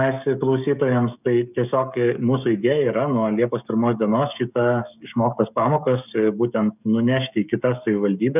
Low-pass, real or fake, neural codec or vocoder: 3.6 kHz; fake; codec, 16 kHz, 16 kbps, FreqCodec, smaller model